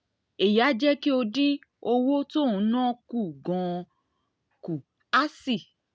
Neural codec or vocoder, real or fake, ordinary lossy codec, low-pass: none; real; none; none